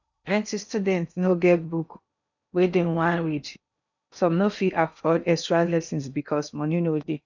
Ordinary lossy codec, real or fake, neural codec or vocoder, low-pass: none; fake; codec, 16 kHz in and 24 kHz out, 0.8 kbps, FocalCodec, streaming, 65536 codes; 7.2 kHz